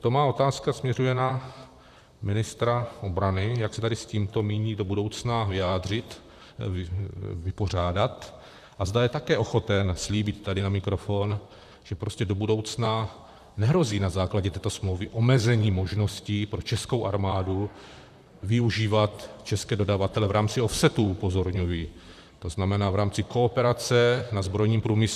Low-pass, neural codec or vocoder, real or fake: 14.4 kHz; vocoder, 44.1 kHz, 128 mel bands, Pupu-Vocoder; fake